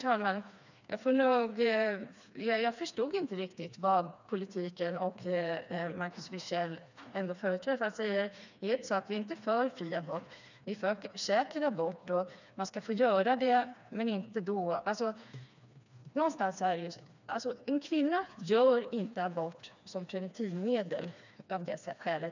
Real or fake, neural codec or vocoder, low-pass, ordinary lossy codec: fake; codec, 16 kHz, 2 kbps, FreqCodec, smaller model; 7.2 kHz; none